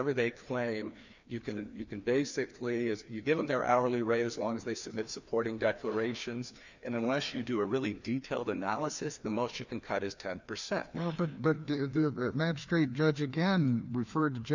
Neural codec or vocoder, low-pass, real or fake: codec, 16 kHz, 2 kbps, FreqCodec, larger model; 7.2 kHz; fake